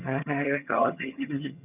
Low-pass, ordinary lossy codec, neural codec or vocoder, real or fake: 3.6 kHz; none; vocoder, 22.05 kHz, 80 mel bands, HiFi-GAN; fake